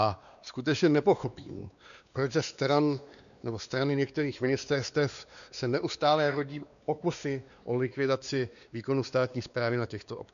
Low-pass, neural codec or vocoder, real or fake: 7.2 kHz; codec, 16 kHz, 2 kbps, X-Codec, WavLM features, trained on Multilingual LibriSpeech; fake